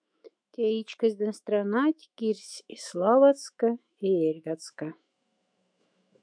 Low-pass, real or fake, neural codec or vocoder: 9.9 kHz; fake; autoencoder, 48 kHz, 128 numbers a frame, DAC-VAE, trained on Japanese speech